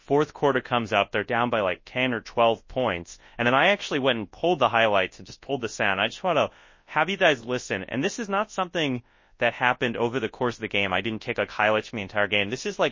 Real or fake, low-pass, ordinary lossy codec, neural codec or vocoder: fake; 7.2 kHz; MP3, 32 kbps; codec, 24 kHz, 0.9 kbps, WavTokenizer, large speech release